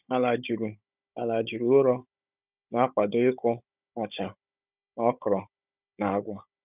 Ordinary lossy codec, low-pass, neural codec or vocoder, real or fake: none; 3.6 kHz; codec, 16 kHz, 16 kbps, FunCodec, trained on Chinese and English, 50 frames a second; fake